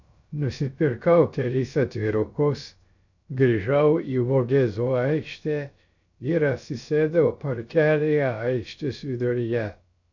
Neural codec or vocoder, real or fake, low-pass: codec, 16 kHz, 0.3 kbps, FocalCodec; fake; 7.2 kHz